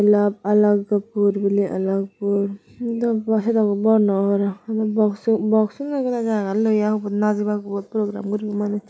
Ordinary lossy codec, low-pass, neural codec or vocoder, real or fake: none; none; none; real